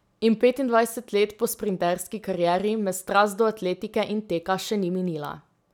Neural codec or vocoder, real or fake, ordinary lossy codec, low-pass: none; real; none; 19.8 kHz